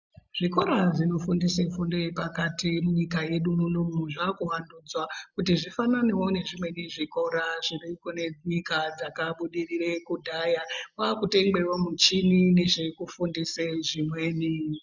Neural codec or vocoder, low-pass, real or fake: none; 7.2 kHz; real